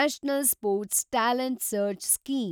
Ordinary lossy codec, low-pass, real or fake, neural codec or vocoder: none; none; real; none